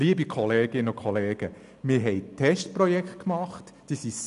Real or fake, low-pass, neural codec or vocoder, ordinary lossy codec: real; 10.8 kHz; none; none